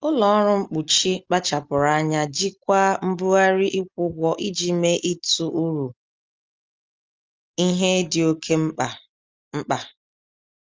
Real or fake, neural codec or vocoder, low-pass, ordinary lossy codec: real; none; 7.2 kHz; Opus, 32 kbps